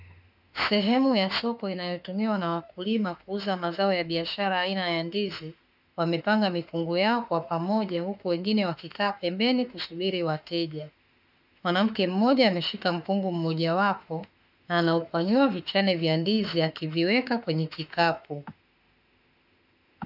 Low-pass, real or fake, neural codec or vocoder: 5.4 kHz; fake; autoencoder, 48 kHz, 32 numbers a frame, DAC-VAE, trained on Japanese speech